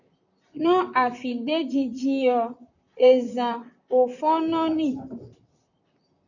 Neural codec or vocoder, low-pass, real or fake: vocoder, 44.1 kHz, 128 mel bands, Pupu-Vocoder; 7.2 kHz; fake